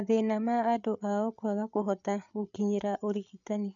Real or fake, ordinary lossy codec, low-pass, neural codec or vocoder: fake; none; 7.2 kHz; codec, 16 kHz, 8 kbps, FreqCodec, larger model